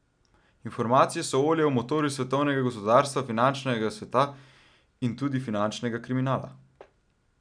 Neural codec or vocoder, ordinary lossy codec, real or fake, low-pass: none; none; real; 9.9 kHz